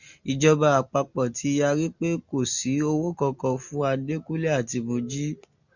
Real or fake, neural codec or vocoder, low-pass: real; none; 7.2 kHz